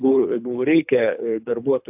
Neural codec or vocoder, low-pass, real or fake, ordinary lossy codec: codec, 24 kHz, 3 kbps, HILCodec; 3.6 kHz; fake; AAC, 32 kbps